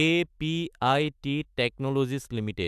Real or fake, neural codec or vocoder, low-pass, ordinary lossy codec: fake; autoencoder, 48 kHz, 128 numbers a frame, DAC-VAE, trained on Japanese speech; 14.4 kHz; AAC, 96 kbps